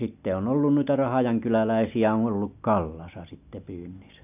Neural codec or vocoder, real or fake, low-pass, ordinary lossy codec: none; real; 3.6 kHz; none